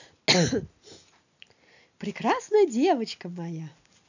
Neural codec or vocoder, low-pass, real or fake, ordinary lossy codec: none; 7.2 kHz; real; none